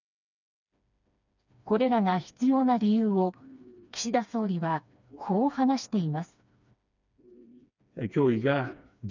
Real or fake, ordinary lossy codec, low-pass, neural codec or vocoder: fake; none; 7.2 kHz; codec, 16 kHz, 2 kbps, FreqCodec, smaller model